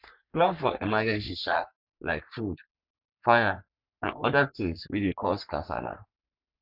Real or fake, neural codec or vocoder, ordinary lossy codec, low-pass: fake; codec, 44.1 kHz, 3.4 kbps, Pupu-Codec; none; 5.4 kHz